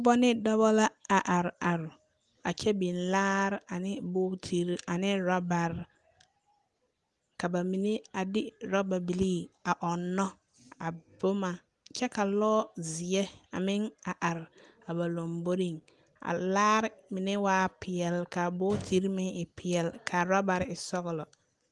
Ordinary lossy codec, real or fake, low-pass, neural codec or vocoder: Opus, 24 kbps; real; 10.8 kHz; none